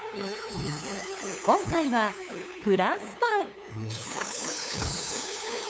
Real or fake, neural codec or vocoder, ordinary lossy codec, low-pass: fake; codec, 16 kHz, 2 kbps, FunCodec, trained on LibriTTS, 25 frames a second; none; none